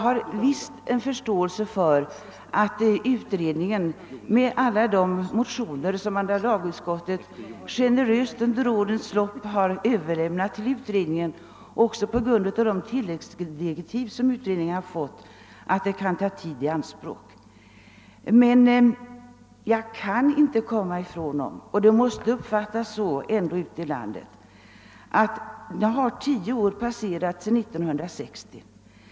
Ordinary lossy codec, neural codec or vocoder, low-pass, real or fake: none; none; none; real